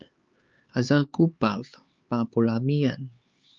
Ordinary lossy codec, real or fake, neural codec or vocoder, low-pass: Opus, 24 kbps; fake; codec, 16 kHz, 4 kbps, X-Codec, HuBERT features, trained on LibriSpeech; 7.2 kHz